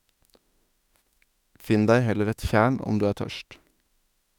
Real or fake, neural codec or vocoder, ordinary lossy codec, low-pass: fake; autoencoder, 48 kHz, 32 numbers a frame, DAC-VAE, trained on Japanese speech; none; 19.8 kHz